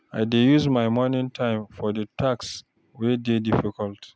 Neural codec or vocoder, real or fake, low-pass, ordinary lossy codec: none; real; none; none